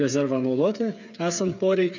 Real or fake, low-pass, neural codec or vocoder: fake; 7.2 kHz; codec, 16 kHz, 8 kbps, FreqCodec, smaller model